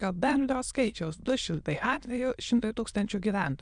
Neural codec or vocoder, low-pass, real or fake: autoencoder, 22.05 kHz, a latent of 192 numbers a frame, VITS, trained on many speakers; 9.9 kHz; fake